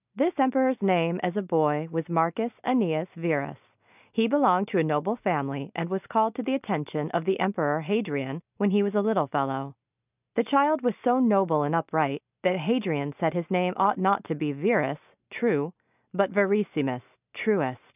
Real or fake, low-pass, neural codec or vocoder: real; 3.6 kHz; none